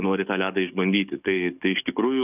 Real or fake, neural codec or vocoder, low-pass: fake; codec, 44.1 kHz, 7.8 kbps, DAC; 3.6 kHz